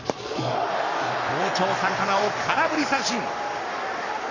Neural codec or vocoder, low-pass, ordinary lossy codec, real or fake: codec, 44.1 kHz, 7.8 kbps, Pupu-Codec; 7.2 kHz; none; fake